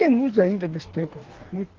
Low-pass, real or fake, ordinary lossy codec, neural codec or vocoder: 7.2 kHz; fake; Opus, 16 kbps; codec, 44.1 kHz, 2.6 kbps, DAC